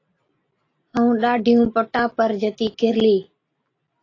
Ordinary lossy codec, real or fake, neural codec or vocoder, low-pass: AAC, 32 kbps; real; none; 7.2 kHz